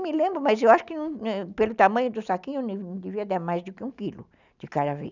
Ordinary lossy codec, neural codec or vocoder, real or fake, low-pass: none; none; real; 7.2 kHz